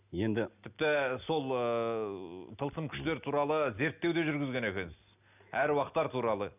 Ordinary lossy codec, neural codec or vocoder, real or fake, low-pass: none; none; real; 3.6 kHz